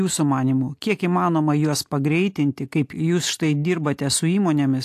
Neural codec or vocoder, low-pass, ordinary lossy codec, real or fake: none; 14.4 kHz; AAC, 64 kbps; real